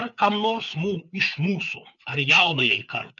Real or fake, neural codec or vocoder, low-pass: fake; codec, 16 kHz, 4 kbps, FreqCodec, larger model; 7.2 kHz